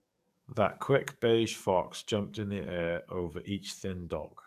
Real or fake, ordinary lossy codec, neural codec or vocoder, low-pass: fake; none; codec, 44.1 kHz, 7.8 kbps, DAC; 14.4 kHz